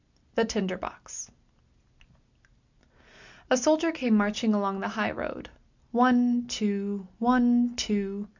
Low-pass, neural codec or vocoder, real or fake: 7.2 kHz; none; real